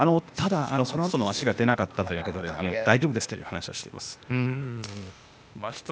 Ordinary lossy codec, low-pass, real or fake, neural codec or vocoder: none; none; fake; codec, 16 kHz, 0.8 kbps, ZipCodec